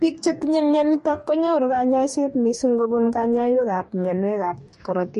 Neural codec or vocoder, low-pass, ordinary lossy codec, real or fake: codec, 44.1 kHz, 2.6 kbps, DAC; 14.4 kHz; MP3, 48 kbps; fake